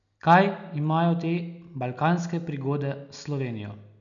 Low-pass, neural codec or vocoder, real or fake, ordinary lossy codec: 7.2 kHz; none; real; none